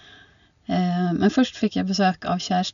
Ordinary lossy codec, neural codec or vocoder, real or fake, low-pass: none; none; real; 7.2 kHz